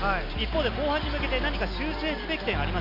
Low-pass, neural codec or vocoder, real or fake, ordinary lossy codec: 5.4 kHz; none; real; MP3, 48 kbps